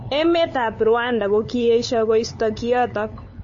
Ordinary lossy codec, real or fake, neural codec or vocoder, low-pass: MP3, 32 kbps; fake; codec, 16 kHz, 8 kbps, FunCodec, trained on LibriTTS, 25 frames a second; 7.2 kHz